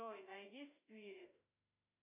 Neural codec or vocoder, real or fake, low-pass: autoencoder, 48 kHz, 32 numbers a frame, DAC-VAE, trained on Japanese speech; fake; 3.6 kHz